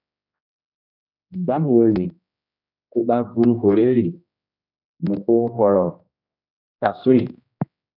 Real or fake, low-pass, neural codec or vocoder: fake; 5.4 kHz; codec, 16 kHz, 1 kbps, X-Codec, HuBERT features, trained on general audio